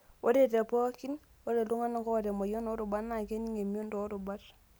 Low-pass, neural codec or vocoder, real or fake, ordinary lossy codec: none; none; real; none